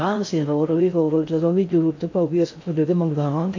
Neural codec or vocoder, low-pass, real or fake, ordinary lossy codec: codec, 16 kHz in and 24 kHz out, 0.6 kbps, FocalCodec, streaming, 4096 codes; 7.2 kHz; fake; AAC, 48 kbps